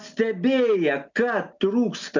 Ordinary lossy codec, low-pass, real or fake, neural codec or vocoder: MP3, 64 kbps; 7.2 kHz; real; none